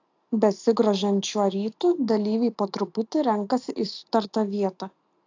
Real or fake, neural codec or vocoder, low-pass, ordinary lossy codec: real; none; 7.2 kHz; AAC, 48 kbps